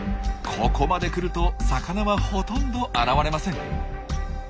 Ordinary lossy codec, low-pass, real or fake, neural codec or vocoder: none; none; real; none